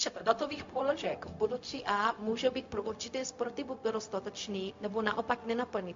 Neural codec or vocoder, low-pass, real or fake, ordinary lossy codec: codec, 16 kHz, 0.4 kbps, LongCat-Audio-Codec; 7.2 kHz; fake; MP3, 48 kbps